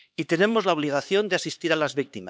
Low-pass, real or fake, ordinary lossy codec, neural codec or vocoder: none; fake; none; codec, 16 kHz, 4 kbps, X-Codec, HuBERT features, trained on LibriSpeech